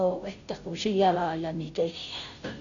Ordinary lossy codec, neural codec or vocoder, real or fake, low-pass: none; codec, 16 kHz, 0.5 kbps, FunCodec, trained on Chinese and English, 25 frames a second; fake; 7.2 kHz